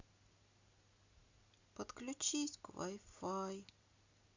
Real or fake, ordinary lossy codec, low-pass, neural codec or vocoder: real; Opus, 64 kbps; 7.2 kHz; none